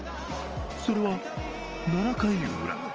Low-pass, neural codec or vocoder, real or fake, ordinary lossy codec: 7.2 kHz; none; real; Opus, 24 kbps